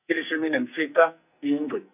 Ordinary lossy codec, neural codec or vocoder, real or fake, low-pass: none; codec, 44.1 kHz, 2.6 kbps, SNAC; fake; 3.6 kHz